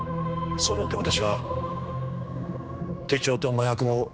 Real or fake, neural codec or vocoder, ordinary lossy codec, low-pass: fake; codec, 16 kHz, 2 kbps, X-Codec, HuBERT features, trained on general audio; none; none